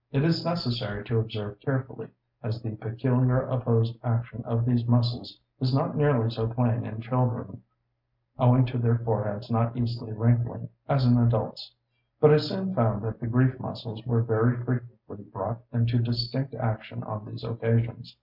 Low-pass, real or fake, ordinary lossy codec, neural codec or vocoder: 5.4 kHz; real; MP3, 48 kbps; none